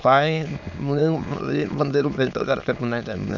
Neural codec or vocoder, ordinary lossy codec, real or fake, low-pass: autoencoder, 22.05 kHz, a latent of 192 numbers a frame, VITS, trained on many speakers; none; fake; 7.2 kHz